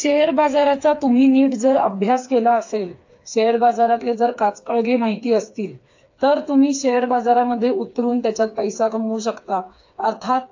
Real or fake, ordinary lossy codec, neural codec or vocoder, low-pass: fake; AAC, 48 kbps; codec, 16 kHz, 4 kbps, FreqCodec, smaller model; 7.2 kHz